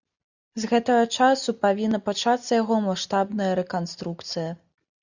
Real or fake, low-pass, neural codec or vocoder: real; 7.2 kHz; none